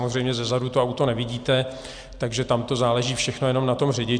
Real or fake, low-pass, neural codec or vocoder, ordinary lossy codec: real; 9.9 kHz; none; Opus, 64 kbps